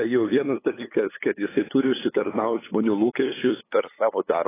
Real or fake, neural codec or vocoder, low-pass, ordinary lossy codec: fake; codec, 16 kHz, 8 kbps, FunCodec, trained on LibriTTS, 25 frames a second; 3.6 kHz; AAC, 16 kbps